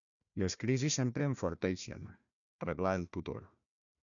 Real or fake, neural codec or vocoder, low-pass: fake; codec, 16 kHz, 1 kbps, FunCodec, trained on Chinese and English, 50 frames a second; 7.2 kHz